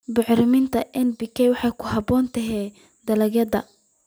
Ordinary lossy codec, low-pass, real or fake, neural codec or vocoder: none; none; real; none